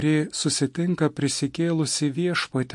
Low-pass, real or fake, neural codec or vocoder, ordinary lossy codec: 10.8 kHz; real; none; MP3, 48 kbps